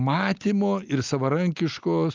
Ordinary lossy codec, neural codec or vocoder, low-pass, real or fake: Opus, 24 kbps; none; 7.2 kHz; real